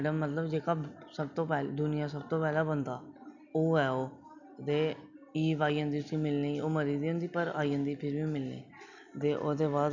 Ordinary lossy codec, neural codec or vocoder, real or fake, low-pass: none; none; real; 7.2 kHz